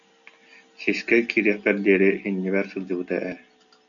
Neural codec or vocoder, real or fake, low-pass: none; real; 7.2 kHz